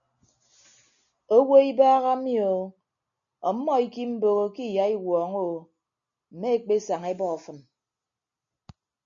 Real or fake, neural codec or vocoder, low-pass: real; none; 7.2 kHz